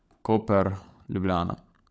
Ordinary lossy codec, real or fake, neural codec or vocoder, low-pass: none; fake; codec, 16 kHz, 16 kbps, FunCodec, trained on LibriTTS, 50 frames a second; none